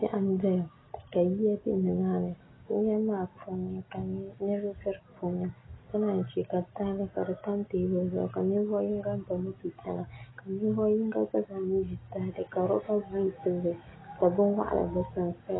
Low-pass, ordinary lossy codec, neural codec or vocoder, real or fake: 7.2 kHz; AAC, 16 kbps; none; real